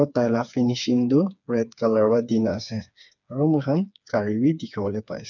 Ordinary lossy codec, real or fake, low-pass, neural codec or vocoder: none; fake; 7.2 kHz; codec, 16 kHz, 4 kbps, FreqCodec, smaller model